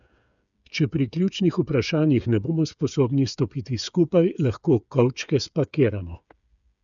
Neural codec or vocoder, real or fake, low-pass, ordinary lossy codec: codec, 16 kHz, 8 kbps, FreqCodec, smaller model; fake; 7.2 kHz; none